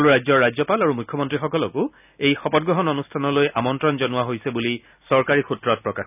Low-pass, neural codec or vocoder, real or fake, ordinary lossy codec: 3.6 kHz; none; real; none